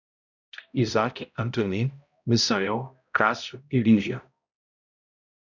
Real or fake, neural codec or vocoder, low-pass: fake; codec, 16 kHz, 0.5 kbps, X-Codec, HuBERT features, trained on balanced general audio; 7.2 kHz